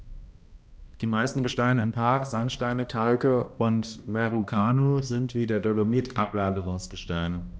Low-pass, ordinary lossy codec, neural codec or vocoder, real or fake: none; none; codec, 16 kHz, 1 kbps, X-Codec, HuBERT features, trained on balanced general audio; fake